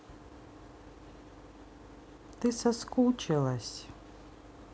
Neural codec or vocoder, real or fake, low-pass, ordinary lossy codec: none; real; none; none